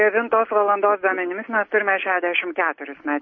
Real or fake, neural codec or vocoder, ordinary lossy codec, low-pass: real; none; MP3, 32 kbps; 7.2 kHz